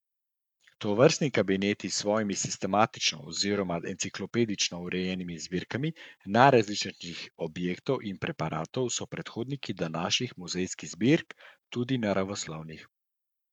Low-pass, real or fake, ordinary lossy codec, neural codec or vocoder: 19.8 kHz; fake; none; codec, 44.1 kHz, 7.8 kbps, Pupu-Codec